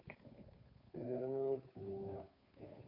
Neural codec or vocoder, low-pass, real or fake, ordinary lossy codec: codec, 16 kHz, 2 kbps, FunCodec, trained on Chinese and English, 25 frames a second; 5.4 kHz; fake; none